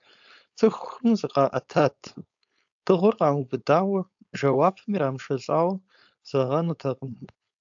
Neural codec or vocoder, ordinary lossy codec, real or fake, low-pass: codec, 16 kHz, 4.8 kbps, FACodec; AAC, 64 kbps; fake; 7.2 kHz